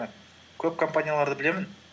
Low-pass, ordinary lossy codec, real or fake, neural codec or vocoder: none; none; real; none